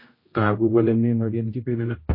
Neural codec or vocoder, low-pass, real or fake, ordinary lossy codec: codec, 16 kHz, 0.5 kbps, X-Codec, HuBERT features, trained on general audio; 5.4 kHz; fake; MP3, 24 kbps